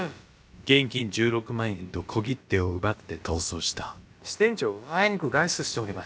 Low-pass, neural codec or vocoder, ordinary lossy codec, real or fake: none; codec, 16 kHz, about 1 kbps, DyCAST, with the encoder's durations; none; fake